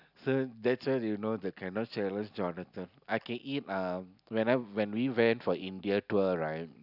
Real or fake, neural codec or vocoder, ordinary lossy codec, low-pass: real; none; none; 5.4 kHz